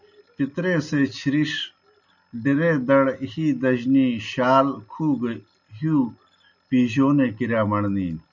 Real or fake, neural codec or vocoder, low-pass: real; none; 7.2 kHz